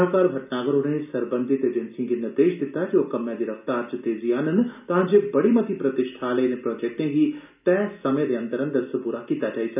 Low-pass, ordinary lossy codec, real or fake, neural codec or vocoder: 3.6 kHz; none; real; none